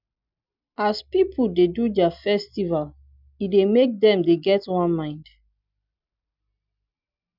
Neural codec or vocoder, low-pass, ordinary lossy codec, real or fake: none; 5.4 kHz; none; real